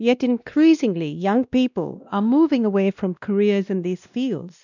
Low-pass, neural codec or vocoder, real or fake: 7.2 kHz; codec, 16 kHz, 1 kbps, X-Codec, WavLM features, trained on Multilingual LibriSpeech; fake